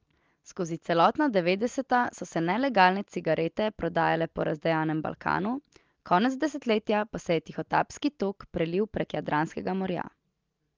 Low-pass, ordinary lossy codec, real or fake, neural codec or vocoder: 7.2 kHz; Opus, 24 kbps; real; none